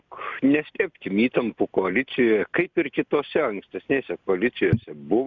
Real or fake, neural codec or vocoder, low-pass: real; none; 7.2 kHz